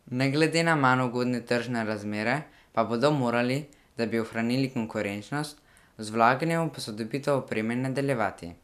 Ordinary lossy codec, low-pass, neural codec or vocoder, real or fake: none; 14.4 kHz; none; real